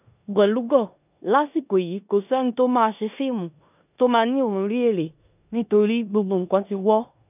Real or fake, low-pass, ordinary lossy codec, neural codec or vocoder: fake; 3.6 kHz; none; codec, 16 kHz in and 24 kHz out, 0.9 kbps, LongCat-Audio-Codec, four codebook decoder